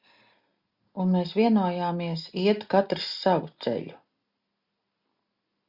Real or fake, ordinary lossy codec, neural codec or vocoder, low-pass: real; Opus, 64 kbps; none; 5.4 kHz